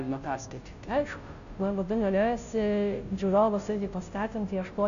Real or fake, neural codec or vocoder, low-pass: fake; codec, 16 kHz, 0.5 kbps, FunCodec, trained on Chinese and English, 25 frames a second; 7.2 kHz